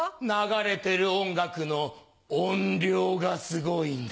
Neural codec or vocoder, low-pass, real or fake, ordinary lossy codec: none; none; real; none